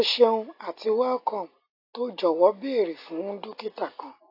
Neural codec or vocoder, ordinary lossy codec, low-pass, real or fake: none; AAC, 32 kbps; 5.4 kHz; real